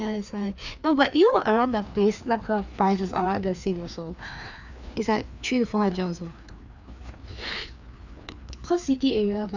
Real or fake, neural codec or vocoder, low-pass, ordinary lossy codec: fake; codec, 16 kHz, 2 kbps, FreqCodec, larger model; 7.2 kHz; none